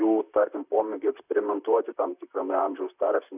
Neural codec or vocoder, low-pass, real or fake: vocoder, 44.1 kHz, 128 mel bands, Pupu-Vocoder; 3.6 kHz; fake